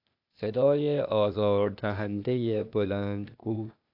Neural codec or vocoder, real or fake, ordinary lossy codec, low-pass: codec, 16 kHz, 0.8 kbps, ZipCodec; fake; AAC, 48 kbps; 5.4 kHz